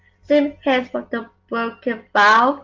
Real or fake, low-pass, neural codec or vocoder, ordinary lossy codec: real; 7.2 kHz; none; Opus, 32 kbps